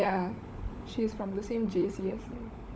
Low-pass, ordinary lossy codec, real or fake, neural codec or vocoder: none; none; fake; codec, 16 kHz, 16 kbps, FunCodec, trained on LibriTTS, 50 frames a second